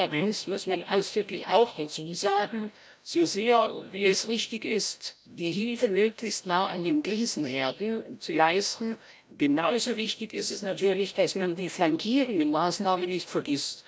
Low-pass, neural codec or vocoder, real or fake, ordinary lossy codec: none; codec, 16 kHz, 0.5 kbps, FreqCodec, larger model; fake; none